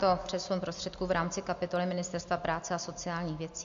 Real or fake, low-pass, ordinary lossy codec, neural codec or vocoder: real; 7.2 kHz; AAC, 64 kbps; none